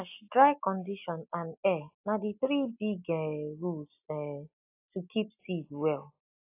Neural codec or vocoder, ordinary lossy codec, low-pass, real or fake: none; AAC, 32 kbps; 3.6 kHz; real